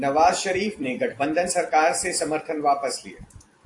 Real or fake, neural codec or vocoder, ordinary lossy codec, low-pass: fake; vocoder, 44.1 kHz, 128 mel bands every 256 samples, BigVGAN v2; AAC, 48 kbps; 10.8 kHz